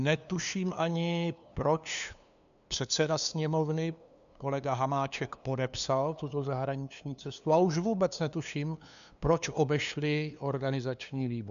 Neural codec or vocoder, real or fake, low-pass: codec, 16 kHz, 2 kbps, FunCodec, trained on LibriTTS, 25 frames a second; fake; 7.2 kHz